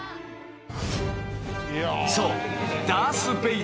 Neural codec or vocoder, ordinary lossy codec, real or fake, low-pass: none; none; real; none